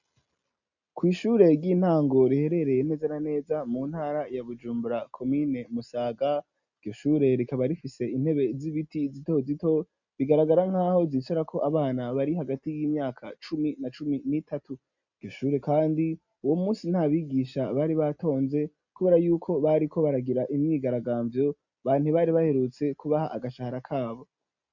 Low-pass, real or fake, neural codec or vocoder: 7.2 kHz; real; none